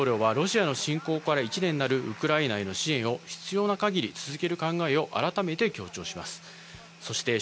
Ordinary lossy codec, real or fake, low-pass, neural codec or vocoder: none; real; none; none